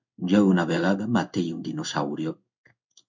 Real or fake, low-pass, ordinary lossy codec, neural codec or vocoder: fake; 7.2 kHz; MP3, 64 kbps; codec, 16 kHz in and 24 kHz out, 1 kbps, XY-Tokenizer